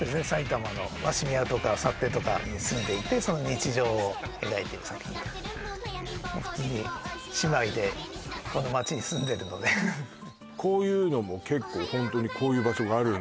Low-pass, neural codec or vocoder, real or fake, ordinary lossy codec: none; none; real; none